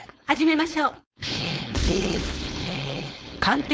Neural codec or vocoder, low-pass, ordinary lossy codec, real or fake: codec, 16 kHz, 4.8 kbps, FACodec; none; none; fake